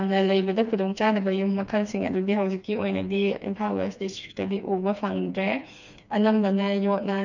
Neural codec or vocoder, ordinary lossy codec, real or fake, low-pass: codec, 16 kHz, 2 kbps, FreqCodec, smaller model; none; fake; 7.2 kHz